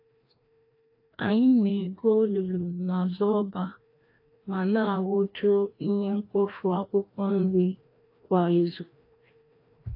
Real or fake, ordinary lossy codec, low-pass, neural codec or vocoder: fake; AAC, 32 kbps; 5.4 kHz; codec, 16 kHz, 1 kbps, FreqCodec, larger model